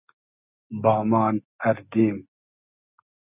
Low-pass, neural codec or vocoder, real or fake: 3.6 kHz; none; real